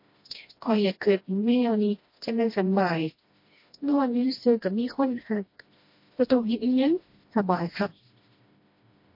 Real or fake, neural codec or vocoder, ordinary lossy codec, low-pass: fake; codec, 16 kHz, 1 kbps, FreqCodec, smaller model; MP3, 32 kbps; 5.4 kHz